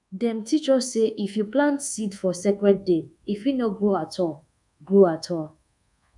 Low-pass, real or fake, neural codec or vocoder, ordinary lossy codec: 10.8 kHz; fake; codec, 24 kHz, 1.2 kbps, DualCodec; none